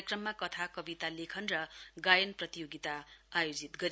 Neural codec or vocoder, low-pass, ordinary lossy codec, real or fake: none; none; none; real